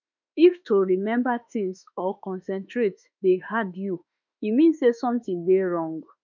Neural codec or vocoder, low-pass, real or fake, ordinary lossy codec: autoencoder, 48 kHz, 32 numbers a frame, DAC-VAE, trained on Japanese speech; 7.2 kHz; fake; none